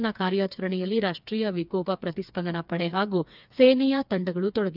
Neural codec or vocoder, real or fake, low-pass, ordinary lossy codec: codec, 16 kHz, 4 kbps, FreqCodec, smaller model; fake; 5.4 kHz; none